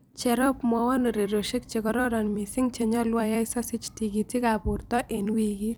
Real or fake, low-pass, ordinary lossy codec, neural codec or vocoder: fake; none; none; vocoder, 44.1 kHz, 128 mel bands every 256 samples, BigVGAN v2